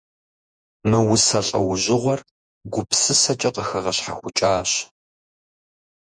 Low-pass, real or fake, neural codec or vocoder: 9.9 kHz; fake; vocoder, 48 kHz, 128 mel bands, Vocos